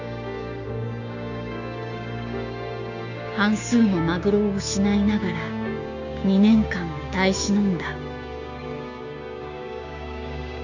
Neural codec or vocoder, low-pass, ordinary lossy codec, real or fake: codec, 16 kHz, 6 kbps, DAC; 7.2 kHz; none; fake